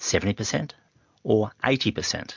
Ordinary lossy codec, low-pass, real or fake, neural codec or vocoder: MP3, 64 kbps; 7.2 kHz; real; none